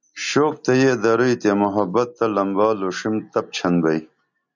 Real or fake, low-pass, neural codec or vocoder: real; 7.2 kHz; none